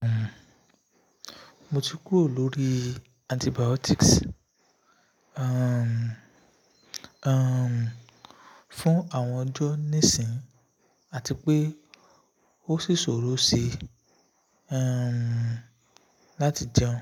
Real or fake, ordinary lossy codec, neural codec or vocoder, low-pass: real; none; none; 19.8 kHz